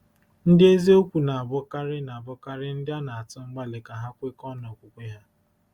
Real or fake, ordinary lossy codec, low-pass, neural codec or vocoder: real; Opus, 64 kbps; 19.8 kHz; none